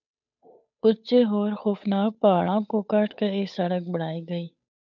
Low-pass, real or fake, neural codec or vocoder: 7.2 kHz; fake; codec, 16 kHz, 8 kbps, FunCodec, trained on Chinese and English, 25 frames a second